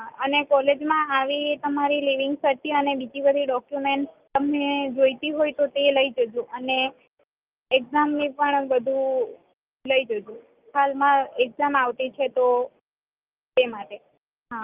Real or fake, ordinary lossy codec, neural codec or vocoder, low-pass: real; Opus, 24 kbps; none; 3.6 kHz